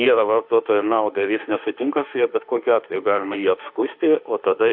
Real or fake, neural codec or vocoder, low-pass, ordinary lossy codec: fake; autoencoder, 48 kHz, 32 numbers a frame, DAC-VAE, trained on Japanese speech; 5.4 kHz; AAC, 48 kbps